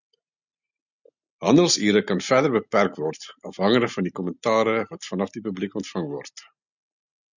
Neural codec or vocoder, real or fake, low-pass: none; real; 7.2 kHz